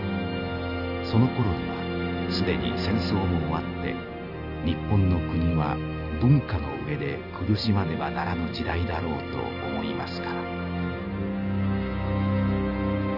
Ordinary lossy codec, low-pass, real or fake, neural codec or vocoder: none; 5.4 kHz; real; none